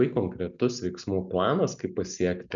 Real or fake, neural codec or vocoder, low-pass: real; none; 7.2 kHz